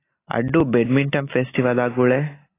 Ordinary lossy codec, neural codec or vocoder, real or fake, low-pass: AAC, 16 kbps; none; real; 3.6 kHz